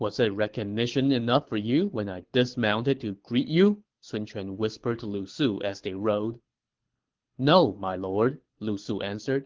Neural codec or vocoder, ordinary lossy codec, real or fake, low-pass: codec, 24 kHz, 6 kbps, HILCodec; Opus, 16 kbps; fake; 7.2 kHz